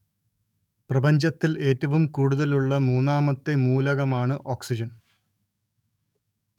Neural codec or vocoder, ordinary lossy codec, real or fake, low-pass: codec, 44.1 kHz, 7.8 kbps, DAC; none; fake; 19.8 kHz